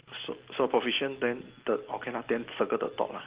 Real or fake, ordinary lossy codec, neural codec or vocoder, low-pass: real; Opus, 16 kbps; none; 3.6 kHz